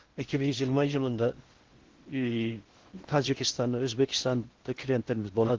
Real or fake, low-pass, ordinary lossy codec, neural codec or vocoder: fake; 7.2 kHz; Opus, 16 kbps; codec, 16 kHz in and 24 kHz out, 0.6 kbps, FocalCodec, streaming, 4096 codes